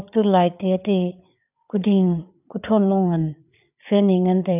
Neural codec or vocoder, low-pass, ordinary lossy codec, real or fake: codec, 16 kHz, 4 kbps, FreqCodec, larger model; 3.6 kHz; none; fake